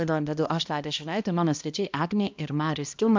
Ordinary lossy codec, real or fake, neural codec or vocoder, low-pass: MP3, 64 kbps; fake; codec, 16 kHz, 1 kbps, X-Codec, HuBERT features, trained on balanced general audio; 7.2 kHz